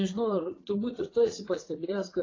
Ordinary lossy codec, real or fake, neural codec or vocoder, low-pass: AAC, 32 kbps; fake; vocoder, 44.1 kHz, 80 mel bands, Vocos; 7.2 kHz